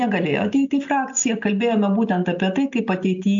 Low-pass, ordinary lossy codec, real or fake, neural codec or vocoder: 7.2 kHz; AAC, 64 kbps; real; none